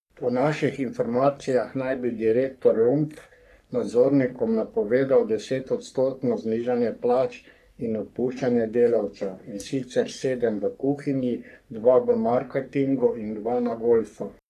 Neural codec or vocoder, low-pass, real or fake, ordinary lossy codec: codec, 44.1 kHz, 3.4 kbps, Pupu-Codec; 14.4 kHz; fake; none